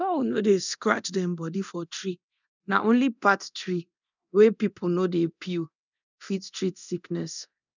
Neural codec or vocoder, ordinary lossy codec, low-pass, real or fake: codec, 24 kHz, 0.9 kbps, DualCodec; none; 7.2 kHz; fake